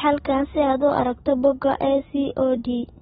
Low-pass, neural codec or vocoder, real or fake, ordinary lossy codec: 19.8 kHz; none; real; AAC, 16 kbps